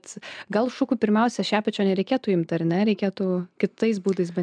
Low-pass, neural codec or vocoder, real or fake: 9.9 kHz; none; real